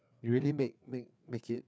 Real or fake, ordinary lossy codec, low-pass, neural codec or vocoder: fake; none; none; codec, 16 kHz, 4 kbps, FreqCodec, larger model